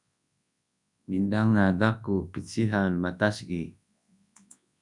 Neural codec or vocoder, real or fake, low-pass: codec, 24 kHz, 0.9 kbps, WavTokenizer, large speech release; fake; 10.8 kHz